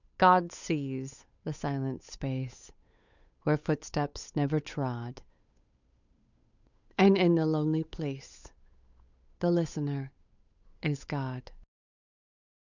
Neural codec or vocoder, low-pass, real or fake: codec, 16 kHz, 8 kbps, FunCodec, trained on Chinese and English, 25 frames a second; 7.2 kHz; fake